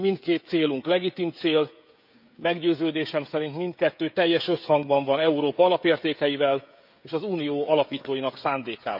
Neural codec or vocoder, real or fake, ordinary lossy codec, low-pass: codec, 16 kHz, 16 kbps, FreqCodec, smaller model; fake; none; 5.4 kHz